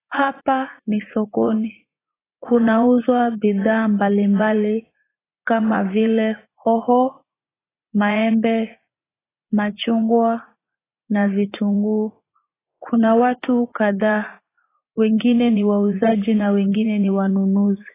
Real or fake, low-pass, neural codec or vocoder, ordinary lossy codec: fake; 3.6 kHz; vocoder, 44.1 kHz, 80 mel bands, Vocos; AAC, 16 kbps